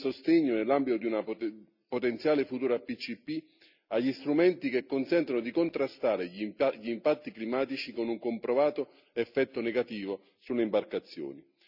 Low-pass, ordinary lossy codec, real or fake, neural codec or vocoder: 5.4 kHz; none; real; none